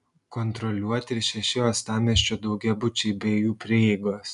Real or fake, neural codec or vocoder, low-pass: real; none; 10.8 kHz